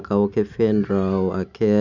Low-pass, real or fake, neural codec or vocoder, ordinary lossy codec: 7.2 kHz; real; none; none